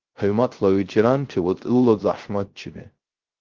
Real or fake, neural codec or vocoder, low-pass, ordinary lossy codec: fake; codec, 16 kHz, 0.3 kbps, FocalCodec; 7.2 kHz; Opus, 16 kbps